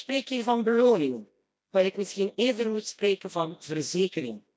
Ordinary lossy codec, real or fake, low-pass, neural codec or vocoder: none; fake; none; codec, 16 kHz, 1 kbps, FreqCodec, smaller model